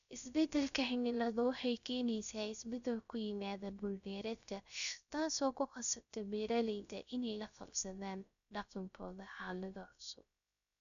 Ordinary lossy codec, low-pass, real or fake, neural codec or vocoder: none; 7.2 kHz; fake; codec, 16 kHz, 0.3 kbps, FocalCodec